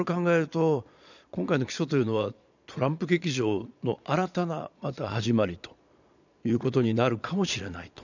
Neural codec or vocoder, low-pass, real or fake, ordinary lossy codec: vocoder, 22.05 kHz, 80 mel bands, Vocos; 7.2 kHz; fake; none